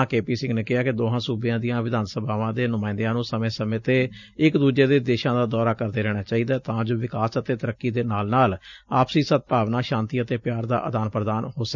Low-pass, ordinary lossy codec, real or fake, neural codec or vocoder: 7.2 kHz; none; real; none